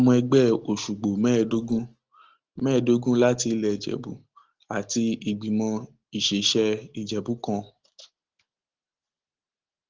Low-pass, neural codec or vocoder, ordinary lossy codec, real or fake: 7.2 kHz; none; Opus, 16 kbps; real